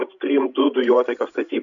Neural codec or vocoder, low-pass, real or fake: codec, 16 kHz, 16 kbps, FreqCodec, larger model; 7.2 kHz; fake